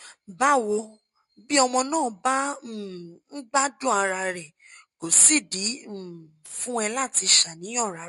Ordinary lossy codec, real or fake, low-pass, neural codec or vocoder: MP3, 48 kbps; real; 14.4 kHz; none